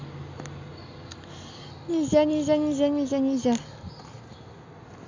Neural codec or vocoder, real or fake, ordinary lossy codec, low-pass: none; real; AAC, 48 kbps; 7.2 kHz